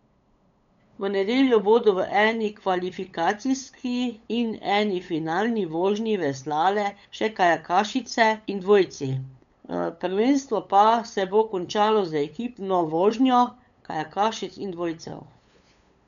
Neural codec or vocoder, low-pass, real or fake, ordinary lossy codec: codec, 16 kHz, 8 kbps, FunCodec, trained on LibriTTS, 25 frames a second; 7.2 kHz; fake; none